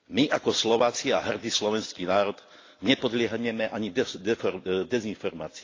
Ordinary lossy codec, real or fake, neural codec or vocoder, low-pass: AAC, 32 kbps; real; none; 7.2 kHz